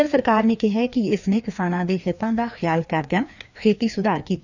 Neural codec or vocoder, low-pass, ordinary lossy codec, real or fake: codec, 16 kHz, 2 kbps, FreqCodec, larger model; 7.2 kHz; none; fake